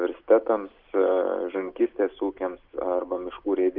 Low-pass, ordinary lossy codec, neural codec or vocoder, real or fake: 5.4 kHz; Opus, 24 kbps; none; real